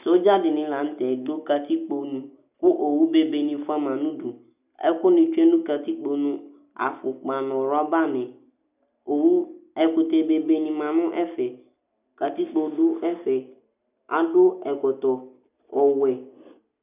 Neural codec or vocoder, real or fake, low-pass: none; real; 3.6 kHz